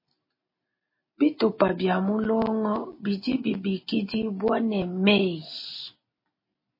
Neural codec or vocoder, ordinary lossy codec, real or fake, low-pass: none; MP3, 24 kbps; real; 5.4 kHz